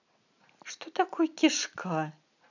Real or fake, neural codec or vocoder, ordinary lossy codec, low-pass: real; none; none; 7.2 kHz